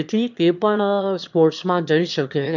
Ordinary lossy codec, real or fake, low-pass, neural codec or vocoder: none; fake; 7.2 kHz; autoencoder, 22.05 kHz, a latent of 192 numbers a frame, VITS, trained on one speaker